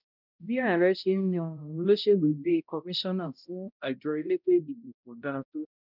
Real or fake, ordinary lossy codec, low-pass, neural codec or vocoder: fake; none; 5.4 kHz; codec, 16 kHz, 0.5 kbps, X-Codec, HuBERT features, trained on balanced general audio